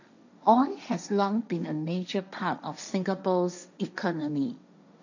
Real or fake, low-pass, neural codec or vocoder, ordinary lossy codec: fake; 7.2 kHz; codec, 16 kHz, 1.1 kbps, Voila-Tokenizer; none